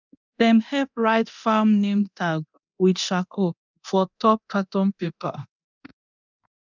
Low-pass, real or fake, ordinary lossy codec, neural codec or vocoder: 7.2 kHz; fake; none; codec, 24 kHz, 0.9 kbps, DualCodec